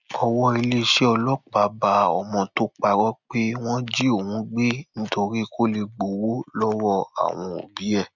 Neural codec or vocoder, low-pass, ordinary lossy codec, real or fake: none; 7.2 kHz; none; real